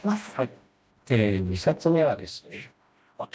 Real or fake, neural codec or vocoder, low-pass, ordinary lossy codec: fake; codec, 16 kHz, 1 kbps, FreqCodec, smaller model; none; none